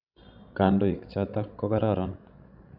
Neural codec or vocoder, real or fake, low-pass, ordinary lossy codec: codec, 16 kHz, 16 kbps, FreqCodec, larger model; fake; 5.4 kHz; none